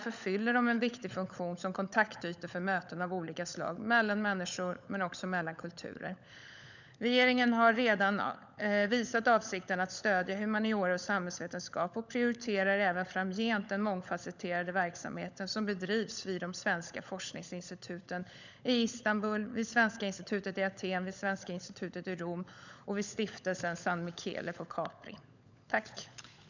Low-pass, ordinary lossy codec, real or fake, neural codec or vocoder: 7.2 kHz; none; fake; codec, 16 kHz, 16 kbps, FunCodec, trained on LibriTTS, 50 frames a second